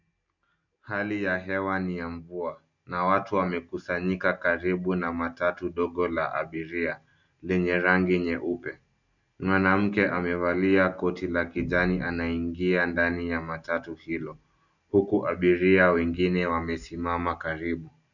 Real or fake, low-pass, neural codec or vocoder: real; 7.2 kHz; none